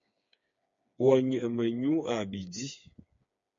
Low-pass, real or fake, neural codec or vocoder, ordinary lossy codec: 7.2 kHz; fake; codec, 16 kHz, 4 kbps, FreqCodec, smaller model; MP3, 48 kbps